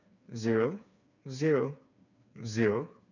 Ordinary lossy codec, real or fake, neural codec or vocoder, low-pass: none; fake; codec, 16 kHz, 4 kbps, FreqCodec, smaller model; 7.2 kHz